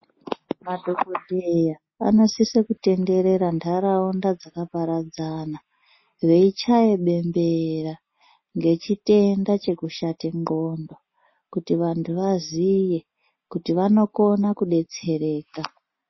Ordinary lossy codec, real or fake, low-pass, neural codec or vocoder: MP3, 24 kbps; real; 7.2 kHz; none